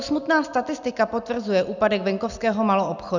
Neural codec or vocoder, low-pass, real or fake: none; 7.2 kHz; real